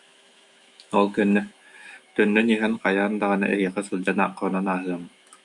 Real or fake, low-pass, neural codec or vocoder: fake; 10.8 kHz; autoencoder, 48 kHz, 128 numbers a frame, DAC-VAE, trained on Japanese speech